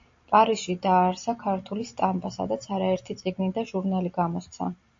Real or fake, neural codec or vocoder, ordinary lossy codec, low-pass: real; none; AAC, 48 kbps; 7.2 kHz